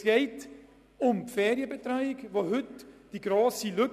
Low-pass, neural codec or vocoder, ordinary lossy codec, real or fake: 14.4 kHz; none; none; real